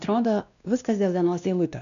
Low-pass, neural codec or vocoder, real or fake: 7.2 kHz; codec, 16 kHz, 1 kbps, X-Codec, WavLM features, trained on Multilingual LibriSpeech; fake